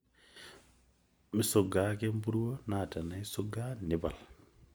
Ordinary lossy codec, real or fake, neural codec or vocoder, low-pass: none; real; none; none